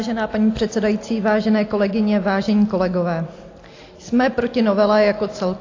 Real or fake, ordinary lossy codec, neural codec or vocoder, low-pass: real; AAC, 32 kbps; none; 7.2 kHz